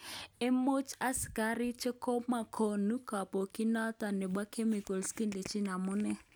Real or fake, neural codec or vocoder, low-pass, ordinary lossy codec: real; none; none; none